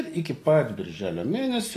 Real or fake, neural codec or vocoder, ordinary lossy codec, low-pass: real; none; AAC, 48 kbps; 14.4 kHz